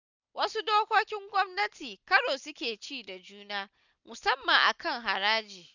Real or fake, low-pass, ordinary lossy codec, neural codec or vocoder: real; 7.2 kHz; none; none